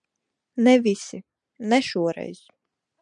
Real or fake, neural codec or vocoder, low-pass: real; none; 9.9 kHz